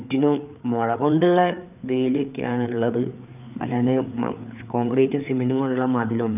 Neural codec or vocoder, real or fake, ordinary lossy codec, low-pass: codec, 16 kHz, 4 kbps, FreqCodec, larger model; fake; none; 3.6 kHz